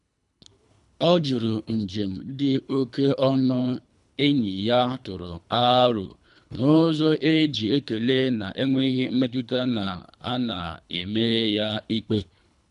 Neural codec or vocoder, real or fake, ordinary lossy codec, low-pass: codec, 24 kHz, 3 kbps, HILCodec; fake; none; 10.8 kHz